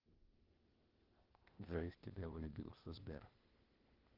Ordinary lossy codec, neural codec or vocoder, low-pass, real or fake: Opus, 16 kbps; codec, 16 kHz, 0.8 kbps, ZipCodec; 5.4 kHz; fake